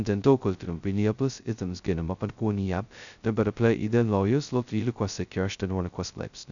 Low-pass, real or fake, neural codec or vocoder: 7.2 kHz; fake; codec, 16 kHz, 0.2 kbps, FocalCodec